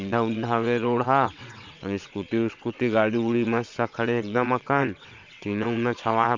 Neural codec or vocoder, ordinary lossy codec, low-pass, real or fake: vocoder, 22.05 kHz, 80 mel bands, WaveNeXt; MP3, 64 kbps; 7.2 kHz; fake